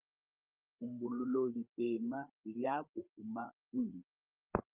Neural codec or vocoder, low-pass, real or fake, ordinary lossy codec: codec, 16 kHz, 8 kbps, FreqCodec, larger model; 3.6 kHz; fake; Opus, 24 kbps